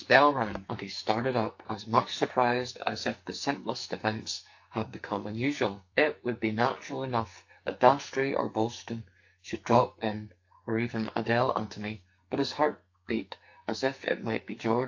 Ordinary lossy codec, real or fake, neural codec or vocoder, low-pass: AAC, 48 kbps; fake; codec, 44.1 kHz, 2.6 kbps, SNAC; 7.2 kHz